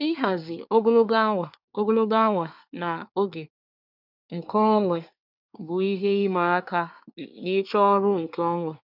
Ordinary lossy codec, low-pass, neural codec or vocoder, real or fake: none; 5.4 kHz; codec, 24 kHz, 1 kbps, SNAC; fake